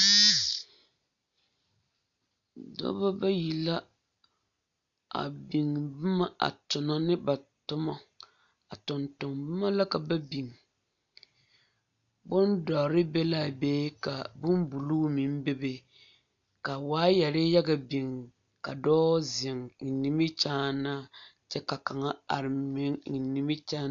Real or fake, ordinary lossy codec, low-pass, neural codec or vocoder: real; MP3, 96 kbps; 7.2 kHz; none